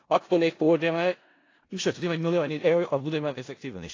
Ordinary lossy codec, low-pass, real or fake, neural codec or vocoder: AAC, 32 kbps; 7.2 kHz; fake; codec, 16 kHz in and 24 kHz out, 0.4 kbps, LongCat-Audio-Codec, four codebook decoder